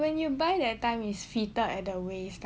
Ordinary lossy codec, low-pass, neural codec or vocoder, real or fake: none; none; none; real